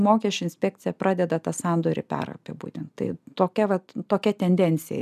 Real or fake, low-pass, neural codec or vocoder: real; 14.4 kHz; none